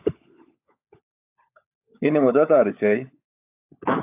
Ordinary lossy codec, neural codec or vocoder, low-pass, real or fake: AAC, 32 kbps; codec, 16 kHz, 16 kbps, FunCodec, trained on LibriTTS, 50 frames a second; 3.6 kHz; fake